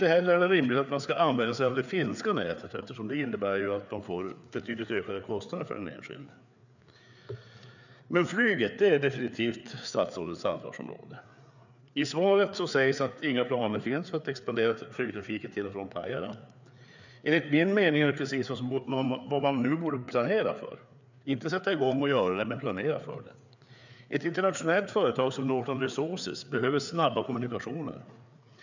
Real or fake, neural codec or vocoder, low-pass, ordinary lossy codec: fake; codec, 16 kHz, 4 kbps, FreqCodec, larger model; 7.2 kHz; none